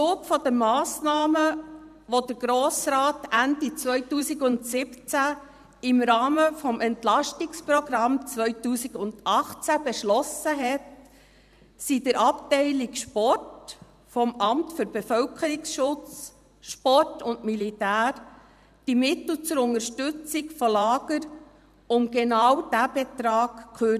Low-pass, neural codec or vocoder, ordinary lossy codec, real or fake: 14.4 kHz; vocoder, 44.1 kHz, 128 mel bands every 512 samples, BigVGAN v2; none; fake